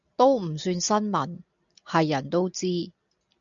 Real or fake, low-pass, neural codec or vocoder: real; 7.2 kHz; none